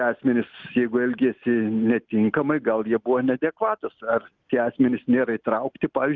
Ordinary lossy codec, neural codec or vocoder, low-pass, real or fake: Opus, 32 kbps; none; 7.2 kHz; real